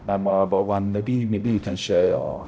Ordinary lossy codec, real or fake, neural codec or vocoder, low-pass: none; fake; codec, 16 kHz, 0.5 kbps, X-Codec, HuBERT features, trained on balanced general audio; none